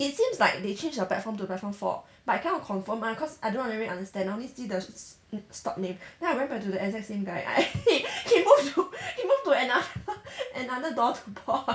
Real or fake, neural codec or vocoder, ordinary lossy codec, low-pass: real; none; none; none